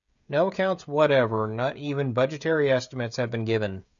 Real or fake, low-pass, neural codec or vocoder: fake; 7.2 kHz; codec, 16 kHz, 16 kbps, FreqCodec, smaller model